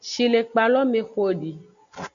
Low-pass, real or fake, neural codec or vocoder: 7.2 kHz; real; none